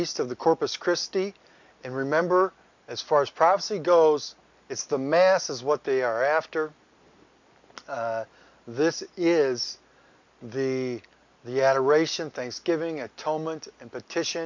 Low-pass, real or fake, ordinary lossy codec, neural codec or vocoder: 7.2 kHz; real; MP3, 64 kbps; none